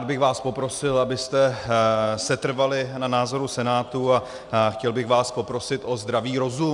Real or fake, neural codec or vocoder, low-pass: real; none; 10.8 kHz